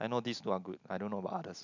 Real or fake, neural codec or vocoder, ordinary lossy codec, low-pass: real; none; none; 7.2 kHz